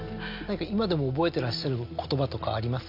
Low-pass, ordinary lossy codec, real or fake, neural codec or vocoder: 5.4 kHz; none; real; none